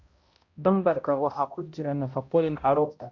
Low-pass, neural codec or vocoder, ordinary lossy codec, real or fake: 7.2 kHz; codec, 16 kHz, 0.5 kbps, X-Codec, HuBERT features, trained on balanced general audio; Opus, 64 kbps; fake